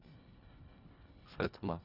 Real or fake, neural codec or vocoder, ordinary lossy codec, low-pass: fake; codec, 24 kHz, 1.5 kbps, HILCodec; none; 5.4 kHz